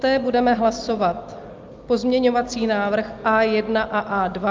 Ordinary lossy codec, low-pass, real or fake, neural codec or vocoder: Opus, 24 kbps; 7.2 kHz; real; none